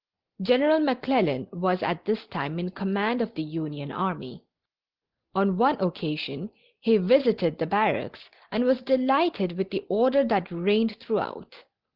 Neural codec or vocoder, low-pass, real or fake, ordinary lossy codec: none; 5.4 kHz; real; Opus, 16 kbps